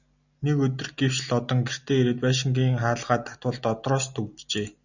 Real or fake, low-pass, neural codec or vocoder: real; 7.2 kHz; none